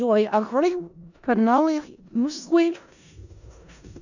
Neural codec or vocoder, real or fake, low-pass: codec, 16 kHz in and 24 kHz out, 0.4 kbps, LongCat-Audio-Codec, four codebook decoder; fake; 7.2 kHz